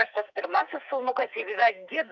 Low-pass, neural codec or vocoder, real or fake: 7.2 kHz; codec, 44.1 kHz, 2.6 kbps, SNAC; fake